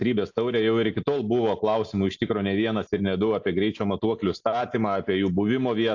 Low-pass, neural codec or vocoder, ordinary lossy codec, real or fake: 7.2 kHz; none; AAC, 48 kbps; real